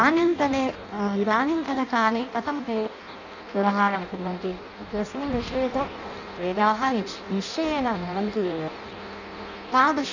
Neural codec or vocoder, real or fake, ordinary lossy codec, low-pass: codec, 16 kHz in and 24 kHz out, 0.6 kbps, FireRedTTS-2 codec; fake; none; 7.2 kHz